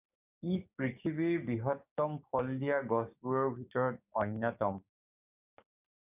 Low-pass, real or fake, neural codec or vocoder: 3.6 kHz; fake; codec, 16 kHz, 6 kbps, DAC